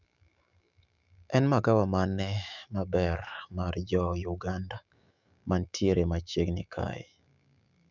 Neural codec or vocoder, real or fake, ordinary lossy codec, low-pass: autoencoder, 48 kHz, 128 numbers a frame, DAC-VAE, trained on Japanese speech; fake; none; 7.2 kHz